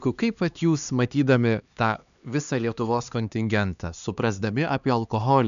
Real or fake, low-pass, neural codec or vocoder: fake; 7.2 kHz; codec, 16 kHz, 2 kbps, X-Codec, HuBERT features, trained on LibriSpeech